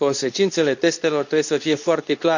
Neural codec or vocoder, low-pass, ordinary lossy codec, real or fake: codec, 24 kHz, 0.9 kbps, WavTokenizer, medium speech release version 2; 7.2 kHz; none; fake